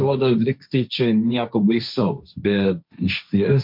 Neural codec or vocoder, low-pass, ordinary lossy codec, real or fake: codec, 16 kHz, 1.1 kbps, Voila-Tokenizer; 5.4 kHz; MP3, 48 kbps; fake